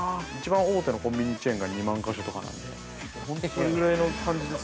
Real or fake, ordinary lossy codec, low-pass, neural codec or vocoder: real; none; none; none